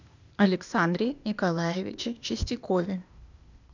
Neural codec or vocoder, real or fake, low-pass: codec, 16 kHz, 0.8 kbps, ZipCodec; fake; 7.2 kHz